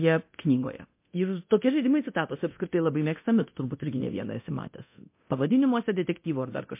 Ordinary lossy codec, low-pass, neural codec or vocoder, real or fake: MP3, 24 kbps; 3.6 kHz; codec, 24 kHz, 0.9 kbps, DualCodec; fake